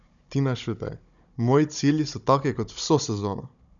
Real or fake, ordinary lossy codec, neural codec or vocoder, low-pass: fake; none; codec, 16 kHz, 16 kbps, FunCodec, trained on Chinese and English, 50 frames a second; 7.2 kHz